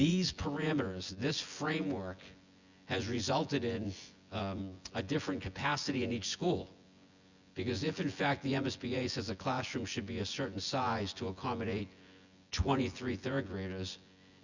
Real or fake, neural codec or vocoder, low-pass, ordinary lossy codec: fake; vocoder, 24 kHz, 100 mel bands, Vocos; 7.2 kHz; Opus, 64 kbps